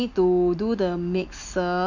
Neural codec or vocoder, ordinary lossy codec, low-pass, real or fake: none; none; 7.2 kHz; real